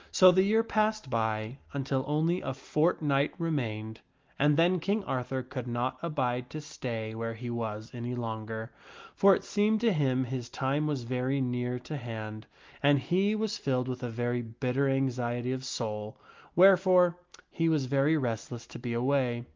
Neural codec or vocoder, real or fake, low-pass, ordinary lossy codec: none; real; 7.2 kHz; Opus, 32 kbps